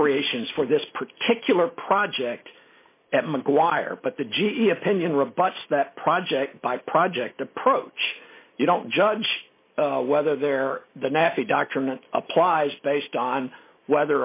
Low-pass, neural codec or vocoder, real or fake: 3.6 kHz; none; real